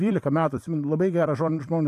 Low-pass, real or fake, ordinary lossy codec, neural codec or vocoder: 14.4 kHz; fake; AAC, 64 kbps; vocoder, 44.1 kHz, 128 mel bands every 256 samples, BigVGAN v2